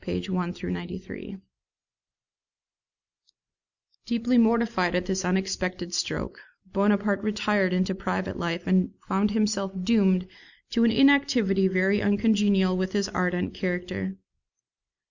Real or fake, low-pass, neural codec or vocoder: real; 7.2 kHz; none